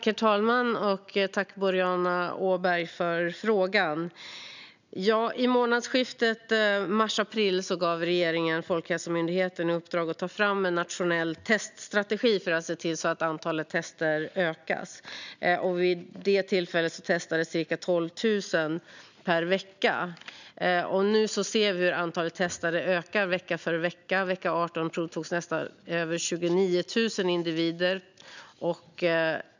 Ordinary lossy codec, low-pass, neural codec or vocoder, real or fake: none; 7.2 kHz; none; real